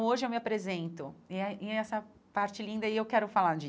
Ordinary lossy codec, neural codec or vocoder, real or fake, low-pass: none; none; real; none